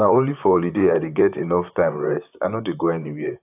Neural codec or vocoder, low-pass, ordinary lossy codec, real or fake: vocoder, 44.1 kHz, 128 mel bands, Pupu-Vocoder; 3.6 kHz; AAC, 32 kbps; fake